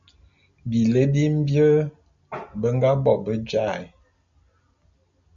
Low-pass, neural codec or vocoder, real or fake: 7.2 kHz; none; real